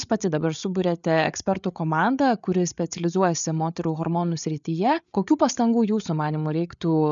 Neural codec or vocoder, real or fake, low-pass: codec, 16 kHz, 8 kbps, FreqCodec, larger model; fake; 7.2 kHz